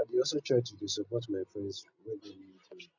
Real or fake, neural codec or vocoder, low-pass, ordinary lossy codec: real; none; 7.2 kHz; none